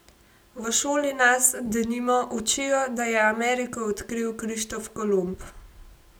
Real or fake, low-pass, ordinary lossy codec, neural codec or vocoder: real; none; none; none